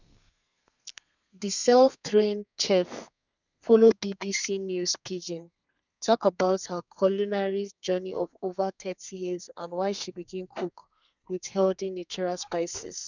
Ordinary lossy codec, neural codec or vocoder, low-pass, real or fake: none; codec, 32 kHz, 1.9 kbps, SNAC; 7.2 kHz; fake